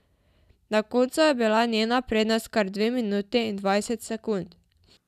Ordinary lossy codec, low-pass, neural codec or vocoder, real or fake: none; 14.4 kHz; none; real